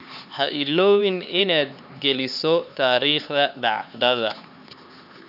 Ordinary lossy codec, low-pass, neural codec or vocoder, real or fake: none; 5.4 kHz; codec, 16 kHz, 4 kbps, X-Codec, HuBERT features, trained on LibriSpeech; fake